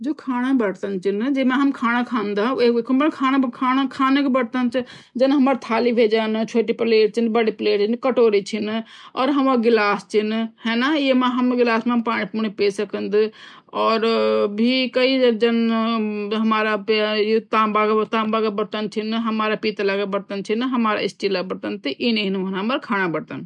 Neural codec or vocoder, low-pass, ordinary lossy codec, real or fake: none; 10.8 kHz; none; real